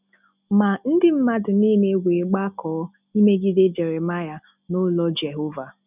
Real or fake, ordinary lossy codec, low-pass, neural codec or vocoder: real; none; 3.6 kHz; none